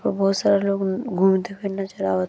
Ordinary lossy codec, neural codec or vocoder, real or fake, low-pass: none; none; real; none